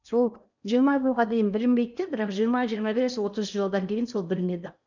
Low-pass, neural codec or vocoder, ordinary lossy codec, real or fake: 7.2 kHz; codec, 16 kHz in and 24 kHz out, 0.8 kbps, FocalCodec, streaming, 65536 codes; none; fake